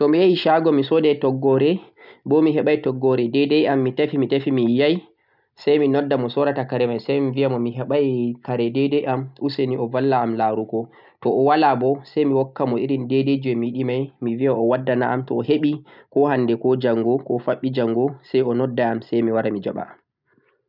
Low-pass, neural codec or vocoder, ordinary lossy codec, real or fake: 5.4 kHz; none; none; real